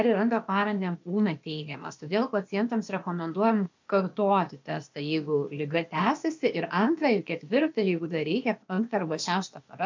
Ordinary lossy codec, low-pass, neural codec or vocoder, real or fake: MP3, 64 kbps; 7.2 kHz; codec, 16 kHz, 0.8 kbps, ZipCodec; fake